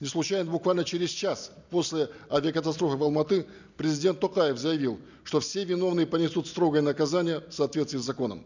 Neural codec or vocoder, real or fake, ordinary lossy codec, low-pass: none; real; none; 7.2 kHz